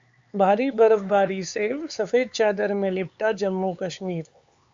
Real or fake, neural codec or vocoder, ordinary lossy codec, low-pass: fake; codec, 16 kHz, 4 kbps, X-Codec, HuBERT features, trained on LibriSpeech; Opus, 64 kbps; 7.2 kHz